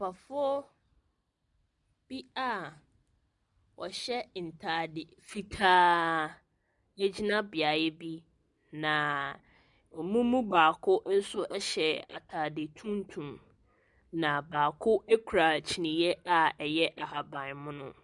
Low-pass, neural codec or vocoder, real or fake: 10.8 kHz; none; real